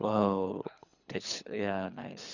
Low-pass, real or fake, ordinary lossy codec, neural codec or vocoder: 7.2 kHz; fake; none; codec, 24 kHz, 3 kbps, HILCodec